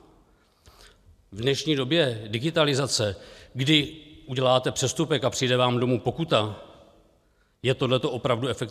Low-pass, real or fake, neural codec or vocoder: 14.4 kHz; real; none